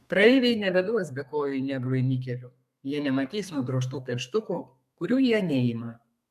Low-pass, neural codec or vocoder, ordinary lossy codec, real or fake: 14.4 kHz; codec, 32 kHz, 1.9 kbps, SNAC; AAC, 96 kbps; fake